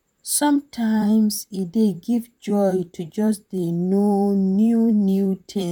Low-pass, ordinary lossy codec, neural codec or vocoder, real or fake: 19.8 kHz; none; vocoder, 44.1 kHz, 128 mel bands, Pupu-Vocoder; fake